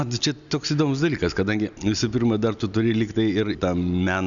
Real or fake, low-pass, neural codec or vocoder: real; 7.2 kHz; none